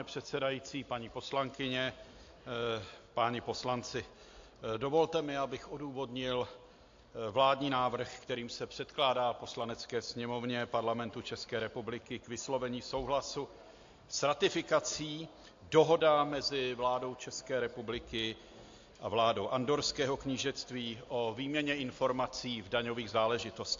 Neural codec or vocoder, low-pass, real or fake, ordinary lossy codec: none; 7.2 kHz; real; AAC, 48 kbps